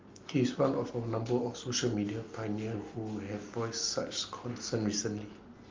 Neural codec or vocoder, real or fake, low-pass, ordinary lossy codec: none; real; 7.2 kHz; Opus, 24 kbps